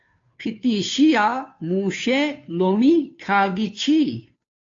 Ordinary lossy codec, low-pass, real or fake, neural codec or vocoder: AAC, 48 kbps; 7.2 kHz; fake; codec, 16 kHz, 2 kbps, FunCodec, trained on Chinese and English, 25 frames a second